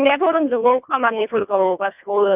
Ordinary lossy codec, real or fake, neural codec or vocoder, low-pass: none; fake; codec, 24 kHz, 1.5 kbps, HILCodec; 3.6 kHz